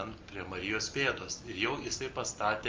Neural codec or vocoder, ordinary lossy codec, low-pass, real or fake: none; Opus, 24 kbps; 7.2 kHz; real